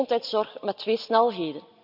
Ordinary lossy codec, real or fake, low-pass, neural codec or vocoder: none; real; 5.4 kHz; none